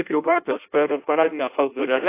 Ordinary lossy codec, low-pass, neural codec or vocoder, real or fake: AAC, 24 kbps; 3.6 kHz; codec, 16 kHz in and 24 kHz out, 0.6 kbps, FireRedTTS-2 codec; fake